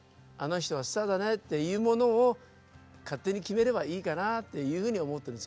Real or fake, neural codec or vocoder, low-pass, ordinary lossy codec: real; none; none; none